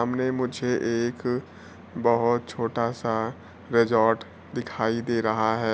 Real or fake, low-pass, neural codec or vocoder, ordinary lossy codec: real; none; none; none